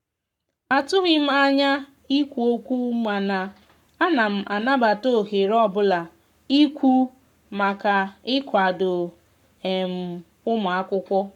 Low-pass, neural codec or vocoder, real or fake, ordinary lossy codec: 19.8 kHz; codec, 44.1 kHz, 7.8 kbps, Pupu-Codec; fake; none